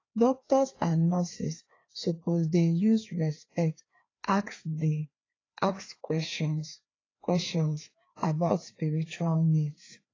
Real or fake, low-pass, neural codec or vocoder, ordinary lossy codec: fake; 7.2 kHz; codec, 16 kHz, 2 kbps, FreqCodec, larger model; AAC, 32 kbps